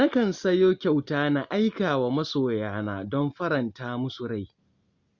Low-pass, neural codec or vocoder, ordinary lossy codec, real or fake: 7.2 kHz; none; Opus, 64 kbps; real